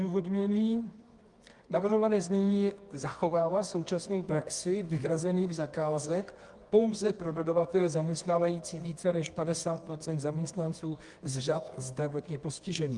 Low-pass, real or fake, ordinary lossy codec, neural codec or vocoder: 10.8 kHz; fake; Opus, 32 kbps; codec, 24 kHz, 0.9 kbps, WavTokenizer, medium music audio release